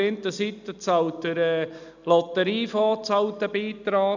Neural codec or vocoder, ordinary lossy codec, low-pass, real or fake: none; none; 7.2 kHz; real